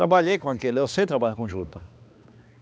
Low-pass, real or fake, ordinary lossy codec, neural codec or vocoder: none; fake; none; codec, 16 kHz, 2 kbps, X-Codec, HuBERT features, trained on balanced general audio